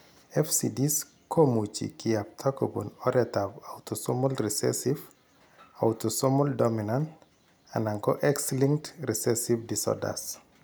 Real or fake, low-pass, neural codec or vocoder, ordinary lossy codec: real; none; none; none